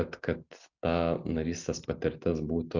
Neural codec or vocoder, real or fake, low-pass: none; real; 7.2 kHz